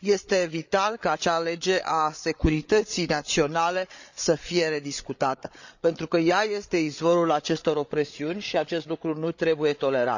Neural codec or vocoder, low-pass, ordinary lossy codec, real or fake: codec, 16 kHz, 8 kbps, FreqCodec, larger model; 7.2 kHz; none; fake